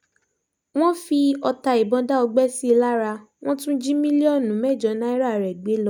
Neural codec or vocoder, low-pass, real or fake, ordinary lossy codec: none; 19.8 kHz; real; none